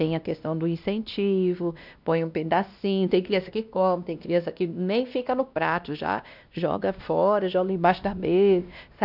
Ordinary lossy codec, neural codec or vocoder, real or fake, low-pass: none; codec, 16 kHz, 1 kbps, X-Codec, WavLM features, trained on Multilingual LibriSpeech; fake; 5.4 kHz